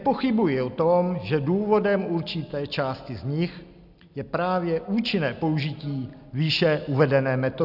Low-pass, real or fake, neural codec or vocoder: 5.4 kHz; real; none